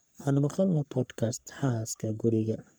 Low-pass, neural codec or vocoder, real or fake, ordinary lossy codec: none; codec, 44.1 kHz, 3.4 kbps, Pupu-Codec; fake; none